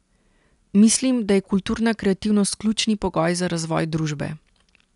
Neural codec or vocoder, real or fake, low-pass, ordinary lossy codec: none; real; 10.8 kHz; none